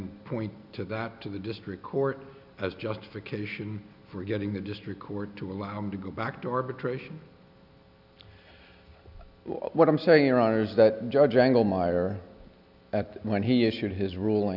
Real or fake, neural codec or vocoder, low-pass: real; none; 5.4 kHz